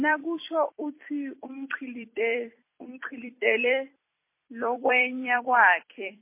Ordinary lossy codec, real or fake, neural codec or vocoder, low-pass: MP3, 24 kbps; fake; vocoder, 44.1 kHz, 128 mel bands every 256 samples, BigVGAN v2; 3.6 kHz